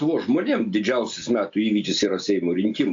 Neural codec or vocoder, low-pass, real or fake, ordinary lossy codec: none; 7.2 kHz; real; MP3, 48 kbps